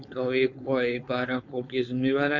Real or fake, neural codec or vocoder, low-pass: fake; codec, 16 kHz, 4.8 kbps, FACodec; 7.2 kHz